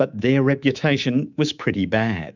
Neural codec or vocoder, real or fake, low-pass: codec, 24 kHz, 3.1 kbps, DualCodec; fake; 7.2 kHz